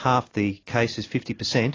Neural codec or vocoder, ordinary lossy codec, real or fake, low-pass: none; AAC, 32 kbps; real; 7.2 kHz